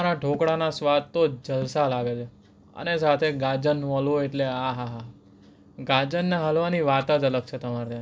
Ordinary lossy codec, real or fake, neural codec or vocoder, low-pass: none; real; none; none